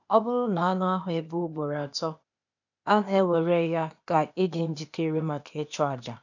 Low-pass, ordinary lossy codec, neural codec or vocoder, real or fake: 7.2 kHz; none; codec, 16 kHz, 0.8 kbps, ZipCodec; fake